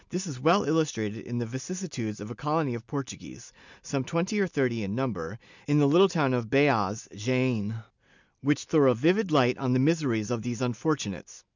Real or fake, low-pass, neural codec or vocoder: real; 7.2 kHz; none